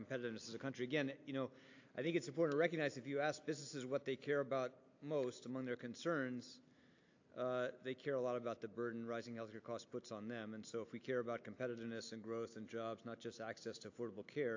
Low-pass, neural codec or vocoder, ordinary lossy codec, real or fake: 7.2 kHz; none; AAC, 48 kbps; real